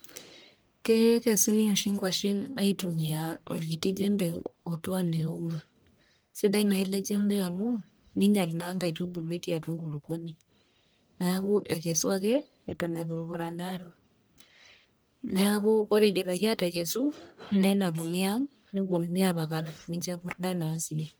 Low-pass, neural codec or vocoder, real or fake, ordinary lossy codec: none; codec, 44.1 kHz, 1.7 kbps, Pupu-Codec; fake; none